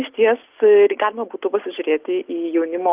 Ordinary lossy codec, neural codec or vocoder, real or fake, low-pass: Opus, 24 kbps; none; real; 3.6 kHz